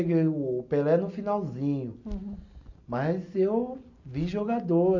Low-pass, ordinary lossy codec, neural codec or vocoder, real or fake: 7.2 kHz; none; none; real